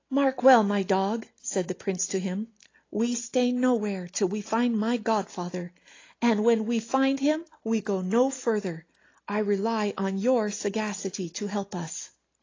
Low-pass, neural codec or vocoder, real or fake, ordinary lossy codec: 7.2 kHz; none; real; AAC, 32 kbps